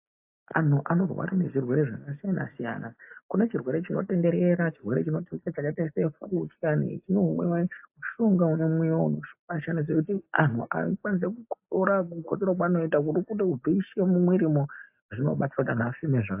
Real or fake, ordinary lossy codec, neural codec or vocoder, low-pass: real; AAC, 32 kbps; none; 3.6 kHz